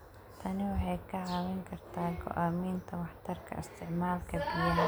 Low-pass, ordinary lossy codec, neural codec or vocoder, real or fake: none; none; none; real